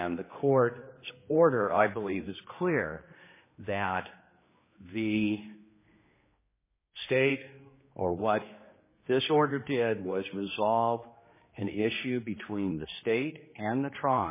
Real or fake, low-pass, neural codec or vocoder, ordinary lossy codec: fake; 3.6 kHz; codec, 16 kHz, 2 kbps, X-Codec, HuBERT features, trained on general audio; MP3, 16 kbps